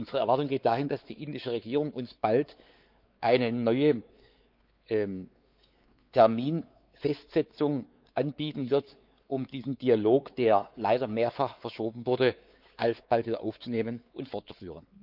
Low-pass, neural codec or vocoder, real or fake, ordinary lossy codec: 5.4 kHz; codec, 16 kHz, 4 kbps, X-Codec, WavLM features, trained on Multilingual LibriSpeech; fake; Opus, 24 kbps